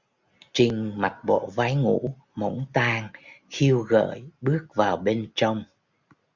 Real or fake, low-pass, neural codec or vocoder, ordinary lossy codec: real; 7.2 kHz; none; Opus, 64 kbps